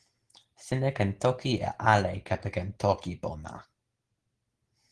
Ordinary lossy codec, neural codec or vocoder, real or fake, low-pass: Opus, 16 kbps; none; real; 10.8 kHz